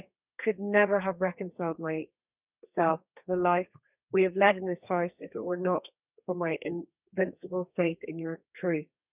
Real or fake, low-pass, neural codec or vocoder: fake; 3.6 kHz; codec, 32 kHz, 1.9 kbps, SNAC